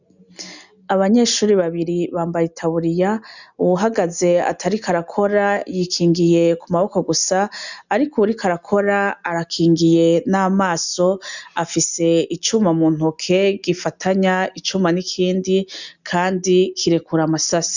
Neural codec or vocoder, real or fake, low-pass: none; real; 7.2 kHz